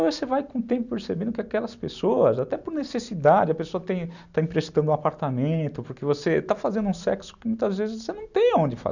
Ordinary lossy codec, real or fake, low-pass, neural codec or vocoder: none; real; 7.2 kHz; none